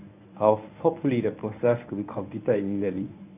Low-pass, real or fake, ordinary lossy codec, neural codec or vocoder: 3.6 kHz; fake; AAC, 32 kbps; codec, 24 kHz, 0.9 kbps, WavTokenizer, medium speech release version 1